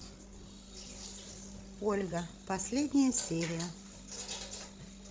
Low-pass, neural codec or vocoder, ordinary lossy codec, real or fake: none; codec, 16 kHz, 16 kbps, FreqCodec, larger model; none; fake